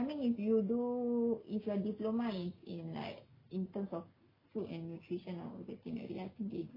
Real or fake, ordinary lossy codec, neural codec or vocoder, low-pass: real; MP3, 32 kbps; none; 5.4 kHz